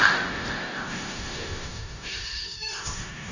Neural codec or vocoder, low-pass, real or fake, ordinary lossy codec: codec, 16 kHz in and 24 kHz out, 0.4 kbps, LongCat-Audio-Codec, four codebook decoder; 7.2 kHz; fake; none